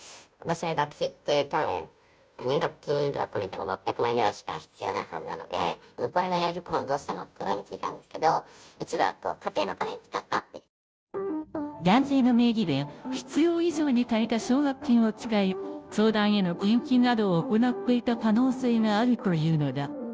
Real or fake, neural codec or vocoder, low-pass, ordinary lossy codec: fake; codec, 16 kHz, 0.5 kbps, FunCodec, trained on Chinese and English, 25 frames a second; none; none